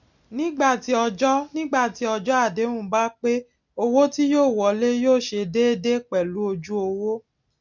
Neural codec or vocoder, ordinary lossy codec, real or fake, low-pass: none; none; real; 7.2 kHz